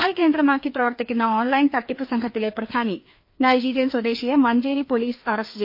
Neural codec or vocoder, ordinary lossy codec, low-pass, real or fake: codec, 16 kHz, 2 kbps, FreqCodec, larger model; MP3, 32 kbps; 5.4 kHz; fake